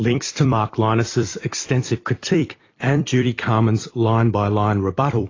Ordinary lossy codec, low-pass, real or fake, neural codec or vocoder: AAC, 32 kbps; 7.2 kHz; fake; vocoder, 44.1 kHz, 128 mel bands every 256 samples, BigVGAN v2